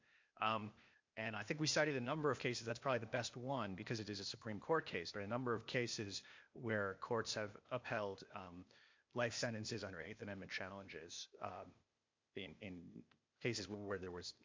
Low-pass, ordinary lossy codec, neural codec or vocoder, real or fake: 7.2 kHz; MP3, 48 kbps; codec, 16 kHz, 0.8 kbps, ZipCodec; fake